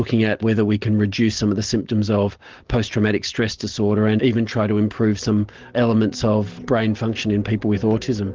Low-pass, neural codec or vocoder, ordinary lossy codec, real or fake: 7.2 kHz; none; Opus, 16 kbps; real